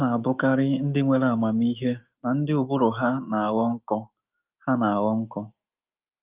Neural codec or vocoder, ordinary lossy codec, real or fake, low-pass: autoencoder, 48 kHz, 128 numbers a frame, DAC-VAE, trained on Japanese speech; Opus, 24 kbps; fake; 3.6 kHz